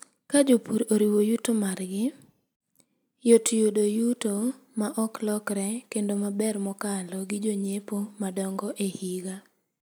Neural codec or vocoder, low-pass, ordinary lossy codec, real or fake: none; none; none; real